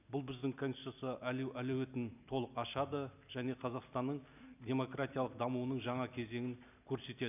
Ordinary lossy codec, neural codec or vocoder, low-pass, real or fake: none; none; 3.6 kHz; real